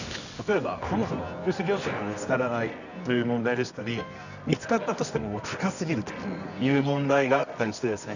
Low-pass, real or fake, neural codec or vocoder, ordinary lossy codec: 7.2 kHz; fake; codec, 24 kHz, 0.9 kbps, WavTokenizer, medium music audio release; none